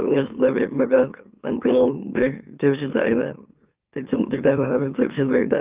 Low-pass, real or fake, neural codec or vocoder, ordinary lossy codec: 3.6 kHz; fake; autoencoder, 44.1 kHz, a latent of 192 numbers a frame, MeloTTS; Opus, 24 kbps